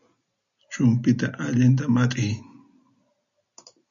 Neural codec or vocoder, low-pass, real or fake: none; 7.2 kHz; real